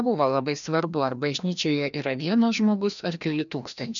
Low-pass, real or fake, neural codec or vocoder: 7.2 kHz; fake; codec, 16 kHz, 1 kbps, FreqCodec, larger model